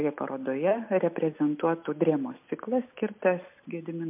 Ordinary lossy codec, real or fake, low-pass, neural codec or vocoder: MP3, 32 kbps; real; 3.6 kHz; none